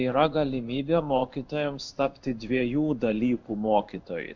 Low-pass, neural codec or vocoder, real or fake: 7.2 kHz; codec, 16 kHz in and 24 kHz out, 1 kbps, XY-Tokenizer; fake